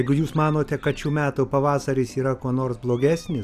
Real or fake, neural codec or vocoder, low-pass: fake; vocoder, 44.1 kHz, 128 mel bands every 256 samples, BigVGAN v2; 14.4 kHz